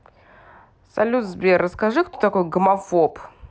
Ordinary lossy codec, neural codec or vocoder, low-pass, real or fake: none; none; none; real